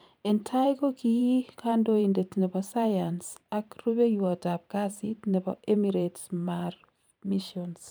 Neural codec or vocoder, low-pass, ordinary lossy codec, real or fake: vocoder, 44.1 kHz, 128 mel bands every 512 samples, BigVGAN v2; none; none; fake